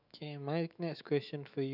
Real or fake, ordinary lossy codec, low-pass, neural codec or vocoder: fake; none; 5.4 kHz; autoencoder, 48 kHz, 128 numbers a frame, DAC-VAE, trained on Japanese speech